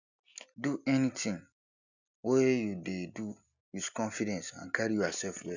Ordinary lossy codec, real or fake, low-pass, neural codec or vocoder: none; real; 7.2 kHz; none